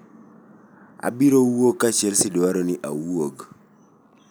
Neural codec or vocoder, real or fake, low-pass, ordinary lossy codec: none; real; none; none